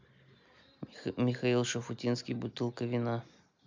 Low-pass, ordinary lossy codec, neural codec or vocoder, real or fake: 7.2 kHz; MP3, 64 kbps; none; real